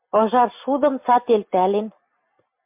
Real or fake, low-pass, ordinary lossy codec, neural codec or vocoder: real; 3.6 kHz; MP3, 32 kbps; none